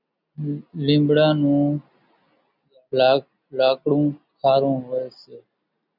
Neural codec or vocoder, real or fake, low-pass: none; real; 5.4 kHz